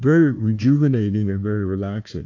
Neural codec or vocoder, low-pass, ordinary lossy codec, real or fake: codec, 16 kHz, 1 kbps, FunCodec, trained on Chinese and English, 50 frames a second; 7.2 kHz; AAC, 48 kbps; fake